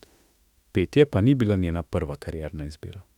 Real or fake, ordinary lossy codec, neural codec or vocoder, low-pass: fake; none; autoencoder, 48 kHz, 32 numbers a frame, DAC-VAE, trained on Japanese speech; 19.8 kHz